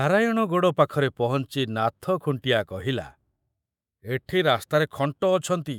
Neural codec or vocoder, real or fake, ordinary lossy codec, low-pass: autoencoder, 48 kHz, 128 numbers a frame, DAC-VAE, trained on Japanese speech; fake; none; 19.8 kHz